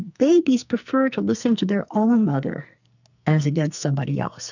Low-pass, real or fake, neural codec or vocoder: 7.2 kHz; fake; codec, 44.1 kHz, 2.6 kbps, SNAC